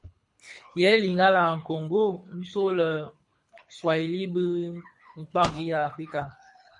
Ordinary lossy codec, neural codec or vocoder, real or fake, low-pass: MP3, 48 kbps; codec, 24 kHz, 3 kbps, HILCodec; fake; 10.8 kHz